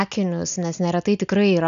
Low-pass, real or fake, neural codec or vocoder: 7.2 kHz; real; none